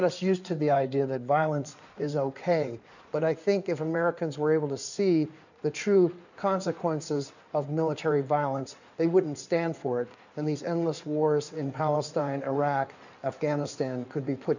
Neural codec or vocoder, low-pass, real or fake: codec, 16 kHz in and 24 kHz out, 2.2 kbps, FireRedTTS-2 codec; 7.2 kHz; fake